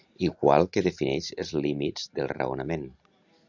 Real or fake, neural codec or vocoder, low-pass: real; none; 7.2 kHz